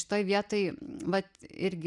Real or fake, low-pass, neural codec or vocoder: real; 10.8 kHz; none